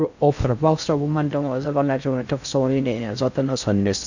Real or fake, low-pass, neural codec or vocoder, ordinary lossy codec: fake; 7.2 kHz; codec, 16 kHz in and 24 kHz out, 0.6 kbps, FocalCodec, streaming, 2048 codes; none